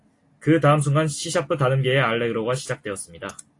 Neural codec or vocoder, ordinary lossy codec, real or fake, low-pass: none; AAC, 48 kbps; real; 10.8 kHz